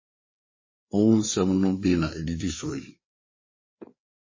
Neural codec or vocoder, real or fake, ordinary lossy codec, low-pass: codec, 16 kHz, 4 kbps, FreqCodec, larger model; fake; MP3, 32 kbps; 7.2 kHz